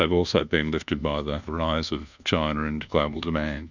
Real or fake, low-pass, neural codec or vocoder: fake; 7.2 kHz; codec, 24 kHz, 1.2 kbps, DualCodec